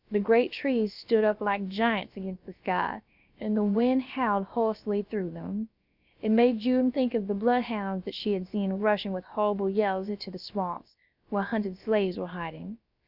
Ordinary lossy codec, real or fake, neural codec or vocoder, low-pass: Opus, 64 kbps; fake; codec, 16 kHz, about 1 kbps, DyCAST, with the encoder's durations; 5.4 kHz